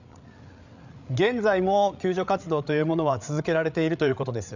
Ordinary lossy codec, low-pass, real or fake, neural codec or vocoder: none; 7.2 kHz; fake; codec, 16 kHz, 8 kbps, FreqCodec, larger model